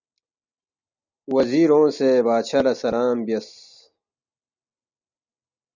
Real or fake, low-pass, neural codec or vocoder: real; 7.2 kHz; none